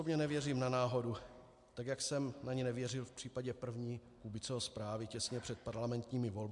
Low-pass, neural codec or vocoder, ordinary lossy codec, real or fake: 10.8 kHz; none; MP3, 64 kbps; real